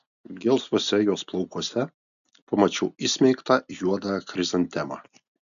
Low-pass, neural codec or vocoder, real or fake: 7.2 kHz; none; real